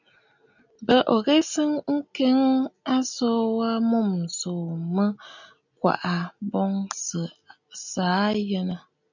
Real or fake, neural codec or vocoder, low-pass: real; none; 7.2 kHz